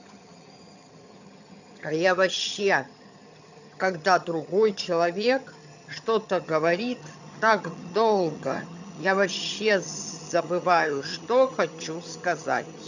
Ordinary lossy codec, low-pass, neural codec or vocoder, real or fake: none; 7.2 kHz; vocoder, 22.05 kHz, 80 mel bands, HiFi-GAN; fake